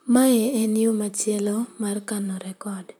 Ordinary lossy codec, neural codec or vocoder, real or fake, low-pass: none; vocoder, 44.1 kHz, 128 mel bands, Pupu-Vocoder; fake; none